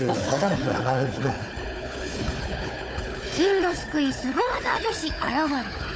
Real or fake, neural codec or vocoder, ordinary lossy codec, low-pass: fake; codec, 16 kHz, 4 kbps, FunCodec, trained on Chinese and English, 50 frames a second; none; none